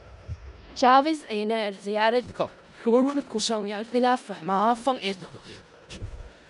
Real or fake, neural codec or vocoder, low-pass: fake; codec, 16 kHz in and 24 kHz out, 0.4 kbps, LongCat-Audio-Codec, four codebook decoder; 10.8 kHz